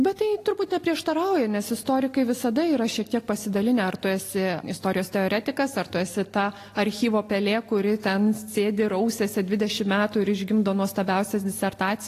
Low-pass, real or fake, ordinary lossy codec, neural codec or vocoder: 14.4 kHz; real; AAC, 48 kbps; none